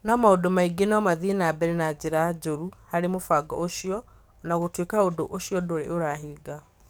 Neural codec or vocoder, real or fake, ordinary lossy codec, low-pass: codec, 44.1 kHz, 7.8 kbps, DAC; fake; none; none